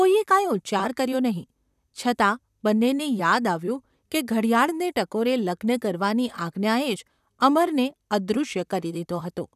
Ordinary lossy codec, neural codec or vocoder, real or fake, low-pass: none; vocoder, 44.1 kHz, 128 mel bands, Pupu-Vocoder; fake; 14.4 kHz